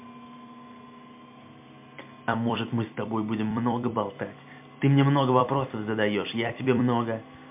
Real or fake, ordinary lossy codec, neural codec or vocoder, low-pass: fake; MP3, 32 kbps; vocoder, 44.1 kHz, 128 mel bands every 256 samples, BigVGAN v2; 3.6 kHz